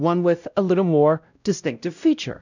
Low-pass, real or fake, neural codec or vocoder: 7.2 kHz; fake; codec, 16 kHz, 0.5 kbps, X-Codec, WavLM features, trained on Multilingual LibriSpeech